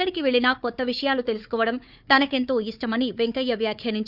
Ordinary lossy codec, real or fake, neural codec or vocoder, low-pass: none; fake; codec, 16 kHz, 4 kbps, FunCodec, trained on Chinese and English, 50 frames a second; 5.4 kHz